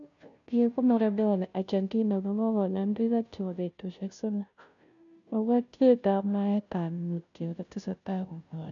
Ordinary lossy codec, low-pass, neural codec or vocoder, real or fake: none; 7.2 kHz; codec, 16 kHz, 0.5 kbps, FunCodec, trained on Chinese and English, 25 frames a second; fake